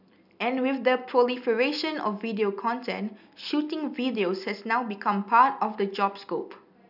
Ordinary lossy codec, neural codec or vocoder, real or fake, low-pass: none; none; real; 5.4 kHz